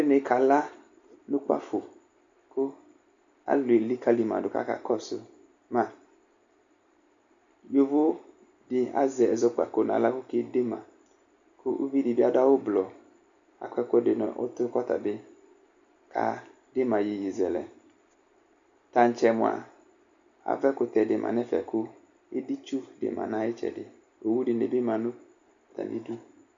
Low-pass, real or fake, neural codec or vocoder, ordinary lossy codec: 7.2 kHz; real; none; MP3, 64 kbps